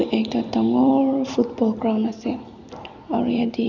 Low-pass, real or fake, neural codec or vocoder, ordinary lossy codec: 7.2 kHz; real; none; none